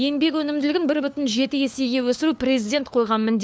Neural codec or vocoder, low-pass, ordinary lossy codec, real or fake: codec, 16 kHz, 4 kbps, FunCodec, trained on LibriTTS, 50 frames a second; none; none; fake